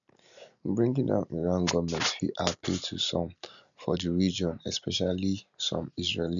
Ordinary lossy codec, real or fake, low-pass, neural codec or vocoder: none; real; 7.2 kHz; none